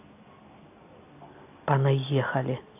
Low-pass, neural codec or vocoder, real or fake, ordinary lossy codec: 3.6 kHz; none; real; AAC, 24 kbps